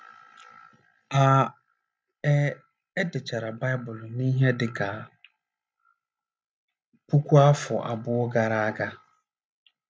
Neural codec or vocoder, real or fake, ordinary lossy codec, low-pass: none; real; none; none